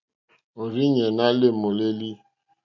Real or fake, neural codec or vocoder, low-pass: real; none; 7.2 kHz